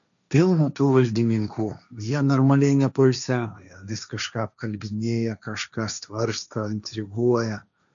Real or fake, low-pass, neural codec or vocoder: fake; 7.2 kHz; codec, 16 kHz, 1.1 kbps, Voila-Tokenizer